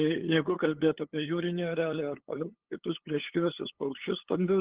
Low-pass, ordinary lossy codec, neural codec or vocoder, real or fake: 3.6 kHz; Opus, 16 kbps; codec, 16 kHz, 8 kbps, FunCodec, trained on LibriTTS, 25 frames a second; fake